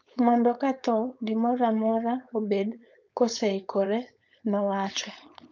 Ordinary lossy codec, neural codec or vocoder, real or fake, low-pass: none; codec, 16 kHz, 4.8 kbps, FACodec; fake; 7.2 kHz